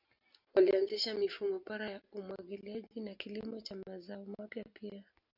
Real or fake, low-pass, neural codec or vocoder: real; 5.4 kHz; none